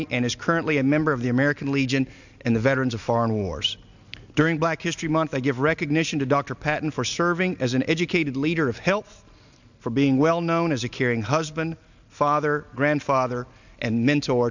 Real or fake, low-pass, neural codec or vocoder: real; 7.2 kHz; none